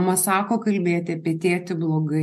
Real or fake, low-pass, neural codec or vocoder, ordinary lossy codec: real; 14.4 kHz; none; MP3, 64 kbps